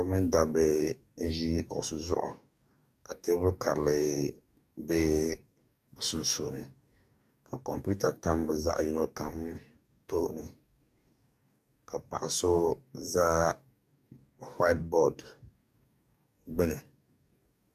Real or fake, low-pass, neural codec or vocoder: fake; 14.4 kHz; codec, 44.1 kHz, 2.6 kbps, DAC